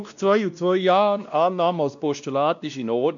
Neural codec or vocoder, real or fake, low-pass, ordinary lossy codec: codec, 16 kHz, 1 kbps, X-Codec, WavLM features, trained on Multilingual LibriSpeech; fake; 7.2 kHz; none